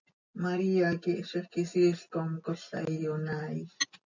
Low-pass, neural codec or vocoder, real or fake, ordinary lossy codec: 7.2 kHz; none; real; MP3, 64 kbps